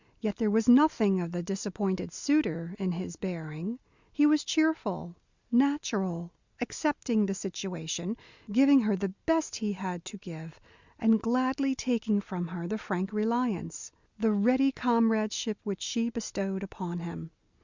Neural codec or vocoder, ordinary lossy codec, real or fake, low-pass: none; Opus, 64 kbps; real; 7.2 kHz